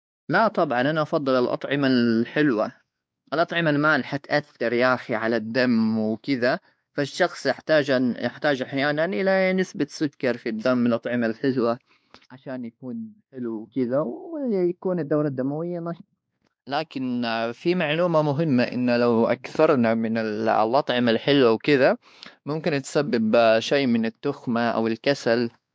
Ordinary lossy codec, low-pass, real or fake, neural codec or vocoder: none; none; fake; codec, 16 kHz, 2 kbps, X-Codec, WavLM features, trained on Multilingual LibriSpeech